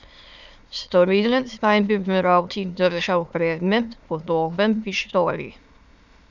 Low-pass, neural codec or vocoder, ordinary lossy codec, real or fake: 7.2 kHz; autoencoder, 22.05 kHz, a latent of 192 numbers a frame, VITS, trained on many speakers; none; fake